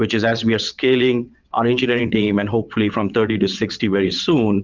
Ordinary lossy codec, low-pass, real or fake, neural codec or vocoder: Opus, 32 kbps; 7.2 kHz; fake; vocoder, 22.05 kHz, 80 mel bands, WaveNeXt